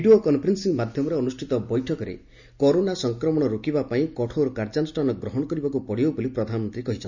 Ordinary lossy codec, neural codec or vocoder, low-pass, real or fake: none; none; 7.2 kHz; real